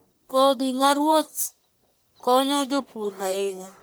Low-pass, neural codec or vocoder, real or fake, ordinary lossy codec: none; codec, 44.1 kHz, 1.7 kbps, Pupu-Codec; fake; none